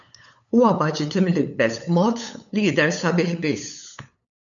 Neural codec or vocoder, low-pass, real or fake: codec, 16 kHz, 8 kbps, FunCodec, trained on LibriTTS, 25 frames a second; 7.2 kHz; fake